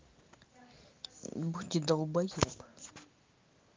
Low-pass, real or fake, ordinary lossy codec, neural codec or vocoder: 7.2 kHz; real; Opus, 24 kbps; none